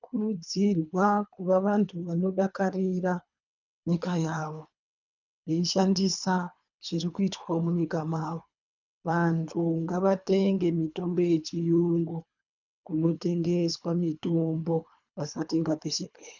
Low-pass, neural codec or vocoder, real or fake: 7.2 kHz; codec, 24 kHz, 3 kbps, HILCodec; fake